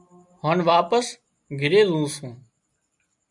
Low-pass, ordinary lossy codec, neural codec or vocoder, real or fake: 10.8 kHz; MP3, 64 kbps; none; real